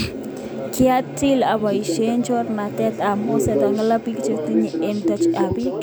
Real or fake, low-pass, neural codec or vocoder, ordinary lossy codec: real; none; none; none